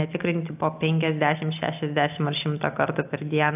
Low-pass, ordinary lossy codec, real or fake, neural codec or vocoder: 3.6 kHz; AAC, 32 kbps; real; none